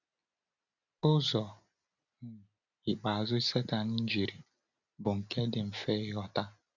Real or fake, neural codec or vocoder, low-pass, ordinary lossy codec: real; none; 7.2 kHz; none